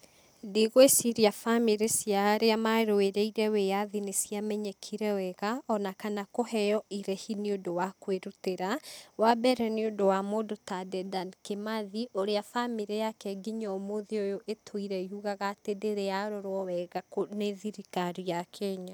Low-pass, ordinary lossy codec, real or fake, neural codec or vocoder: none; none; real; none